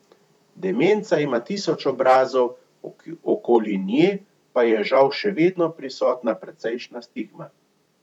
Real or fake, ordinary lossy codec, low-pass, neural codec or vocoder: fake; none; 19.8 kHz; vocoder, 44.1 kHz, 128 mel bands, Pupu-Vocoder